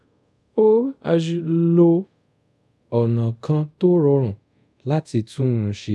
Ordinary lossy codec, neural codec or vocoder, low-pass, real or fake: none; codec, 24 kHz, 0.5 kbps, DualCodec; none; fake